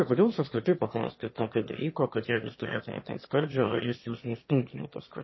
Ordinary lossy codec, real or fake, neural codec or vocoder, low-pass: MP3, 24 kbps; fake; autoencoder, 22.05 kHz, a latent of 192 numbers a frame, VITS, trained on one speaker; 7.2 kHz